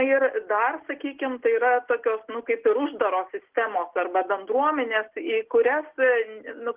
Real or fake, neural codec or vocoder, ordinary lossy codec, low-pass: real; none; Opus, 16 kbps; 3.6 kHz